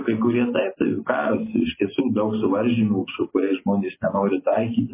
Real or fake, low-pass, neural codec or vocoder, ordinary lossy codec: real; 3.6 kHz; none; MP3, 16 kbps